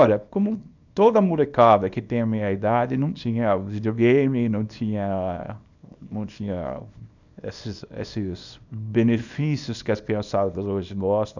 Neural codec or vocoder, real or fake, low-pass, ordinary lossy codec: codec, 24 kHz, 0.9 kbps, WavTokenizer, small release; fake; 7.2 kHz; none